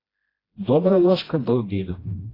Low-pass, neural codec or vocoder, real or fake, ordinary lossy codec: 5.4 kHz; codec, 16 kHz, 1 kbps, FreqCodec, smaller model; fake; AAC, 24 kbps